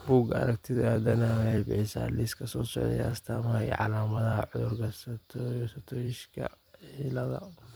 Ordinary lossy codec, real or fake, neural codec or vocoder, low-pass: none; fake; vocoder, 44.1 kHz, 128 mel bands every 256 samples, BigVGAN v2; none